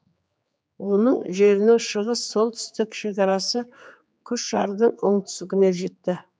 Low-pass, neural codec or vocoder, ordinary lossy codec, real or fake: none; codec, 16 kHz, 4 kbps, X-Codec, HuBERT features, trained on general audio; none; fake